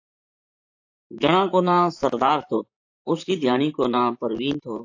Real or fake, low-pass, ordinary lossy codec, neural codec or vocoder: fake; 7.2 kHz; AAC, 48 kbps; vocoder, 44.1 kHz, 128 mel bands, Pupu-Vocoder